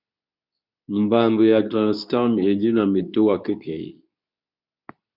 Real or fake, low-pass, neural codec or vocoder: fake; 5.4 kHz; codec, 24 kHz, 0.9 kbps, WavTokenizer, medium speech release version 2